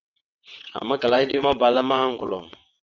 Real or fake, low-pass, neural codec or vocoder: fake; 7.2 kHz; vocoder, 22.05 kHz, 80 mel bands, WaveNeXt